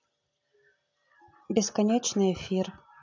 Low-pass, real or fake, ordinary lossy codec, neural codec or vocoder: 7.2 kHz; real; AAC, 48 kbps; none